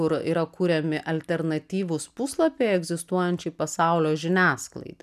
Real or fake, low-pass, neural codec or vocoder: real; 14.4 kHz; none